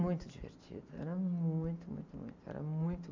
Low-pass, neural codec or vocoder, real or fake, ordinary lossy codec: 7.2 kHz; none; real; MP3, 64 kbps